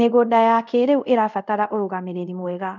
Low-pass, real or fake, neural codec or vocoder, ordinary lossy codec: 7.2 kHz; fake; codec, 24 kHz, 0.5 kbps, DualCodec; none